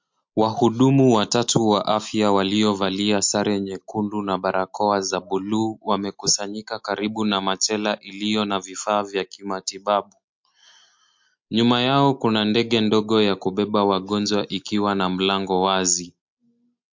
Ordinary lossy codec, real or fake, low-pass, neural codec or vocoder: MP3, 48 kbps; real; 7.2 kHz; none